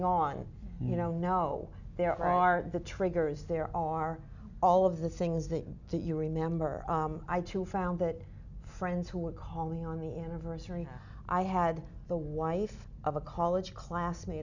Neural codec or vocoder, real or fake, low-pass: none; real; 7.2 kHz